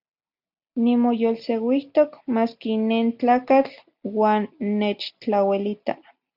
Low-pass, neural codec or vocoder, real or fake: 5.4 kHz; none; real